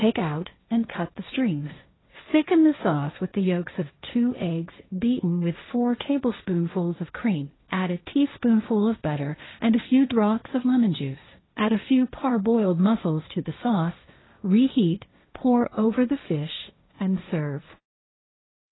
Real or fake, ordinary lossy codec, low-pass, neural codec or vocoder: fake; AAC, 16 kbps; 7.2 kHz; codec, 16 kHz, 1.1 kbps, Voila-Tokenizer